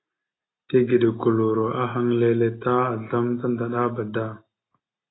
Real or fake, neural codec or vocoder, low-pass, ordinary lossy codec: real; none; 7.2 kHz; AAC, 16 kbps